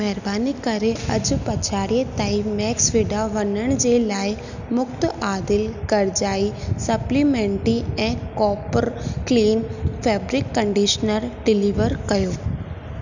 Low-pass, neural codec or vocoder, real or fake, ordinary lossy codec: 7.2 kHz; none; real; none